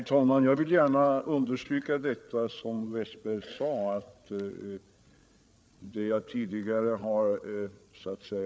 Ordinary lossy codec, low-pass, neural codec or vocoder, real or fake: none; none; codec, 16 kHz, 8 kbps, FreqCodec, larger model; fake